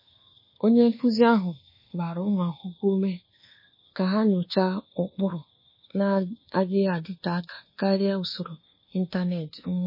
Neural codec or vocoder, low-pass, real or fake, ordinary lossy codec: codec, 24 kHz, 1.2 kbps, DualCodec; 5.4 kHz; fake; MP3, 24 kbps